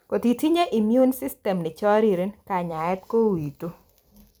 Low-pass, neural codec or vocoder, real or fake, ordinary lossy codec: none; vocoder, 44.1 kHz, 128 mel bands every 256 samples, BigVGAN v2; fake; none